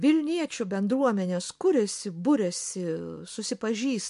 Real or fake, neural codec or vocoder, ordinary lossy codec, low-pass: real; none; MP3, 64 kbps; 10.8 kHz